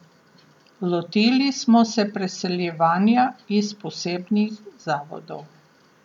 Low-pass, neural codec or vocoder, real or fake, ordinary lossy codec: 19.8 kHz; none; real; none